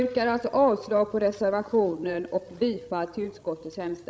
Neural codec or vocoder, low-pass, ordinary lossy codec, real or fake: codec, 16 kHz, 16 kbps, FreqCodec, larger model; none; none; fake